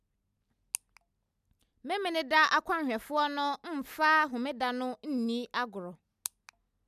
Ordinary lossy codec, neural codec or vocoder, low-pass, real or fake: none; none; 14.4 kHz; real